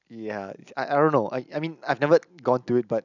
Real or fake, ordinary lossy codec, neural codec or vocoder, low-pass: real; none; none; 7.2 kHz